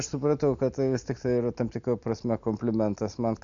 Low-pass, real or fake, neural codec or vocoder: 7.2 kHz; real; none